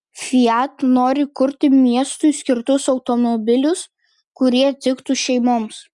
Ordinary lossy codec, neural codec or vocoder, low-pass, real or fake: Opus, 64 kbps; none; 10.8 kHz; real